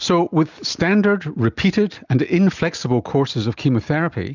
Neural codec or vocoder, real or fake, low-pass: none; real; 7.2 kHz